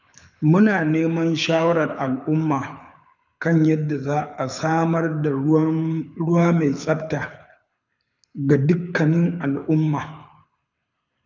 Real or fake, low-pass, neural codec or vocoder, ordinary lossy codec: fake; 7.2 kHz; codec, 24 kHz, 6 kbps, HILCodec; none